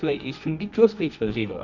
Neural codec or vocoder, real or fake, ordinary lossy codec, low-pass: codec, 24 kHz, 0.9 kbps, WavTokenizer, medium music audio release; fake; none; 7.2 kHz